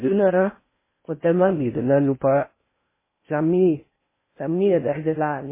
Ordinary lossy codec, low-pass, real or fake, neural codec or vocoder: MP3, 16 kbps; 3.6 kHz; fake; codec, 16 kHz in and 24 kHz out, 0.6 kbps, FocalCodec, streaming, 4096 codes